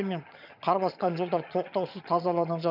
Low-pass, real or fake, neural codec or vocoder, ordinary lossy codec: 5.4 kHz; fake; vocoder, 22.05 kHz, 80 mel bands, HiFi-GAN; none